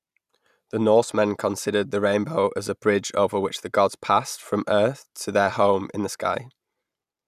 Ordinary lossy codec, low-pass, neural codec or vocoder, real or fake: none; 14.4 kHz; none; real